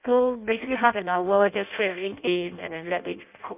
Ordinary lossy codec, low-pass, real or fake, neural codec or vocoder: none; 3.6 kHz; fake; codec, 16 kHz in and 24 kHz out, 0.6 kbps, FireRedTTS-2 codec